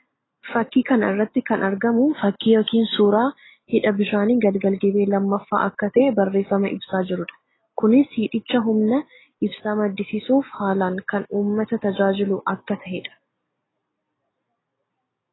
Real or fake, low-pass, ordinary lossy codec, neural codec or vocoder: real; 7.2 kHz; AAC, 16 kbps; none